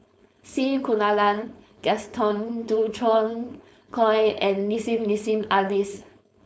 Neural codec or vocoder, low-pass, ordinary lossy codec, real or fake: codec, 16 kHz, 4.8 kbps, FACodec; none; none; fake